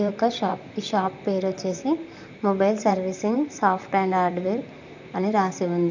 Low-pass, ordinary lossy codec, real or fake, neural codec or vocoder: 7.2 kHz; none; fake; vocoder, 44.1 kHz, 128 mel bands, Pupu-Vocoder